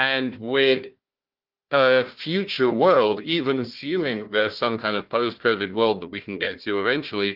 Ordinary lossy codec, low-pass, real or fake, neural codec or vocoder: Opus, 32 kbps; 5.4 kHz; fake; codec, 16 kHz, 1 kbps, FunCodec, trained on Chinese and English, 50 frames a second